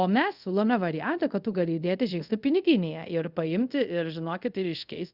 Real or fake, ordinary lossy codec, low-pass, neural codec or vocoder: fake; Opus, 64 kbps; 5.4 kHz; codec, 24 kHz, 0.5 kbps, DualCodec